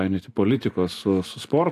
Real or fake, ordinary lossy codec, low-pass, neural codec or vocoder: real; AAC, 64 kbps; 14.4 kHz; none